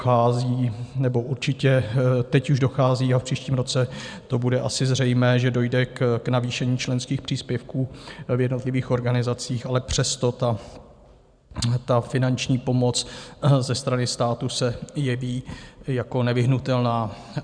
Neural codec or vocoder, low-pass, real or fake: vocoder, 44.1 kHz, 128 mel bands every 512 samples, BigVGAN v2; 9.9 kHz; fake